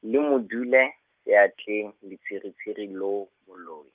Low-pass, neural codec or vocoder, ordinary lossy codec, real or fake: 3.6 kHz; none; Opus, 32 kbps; real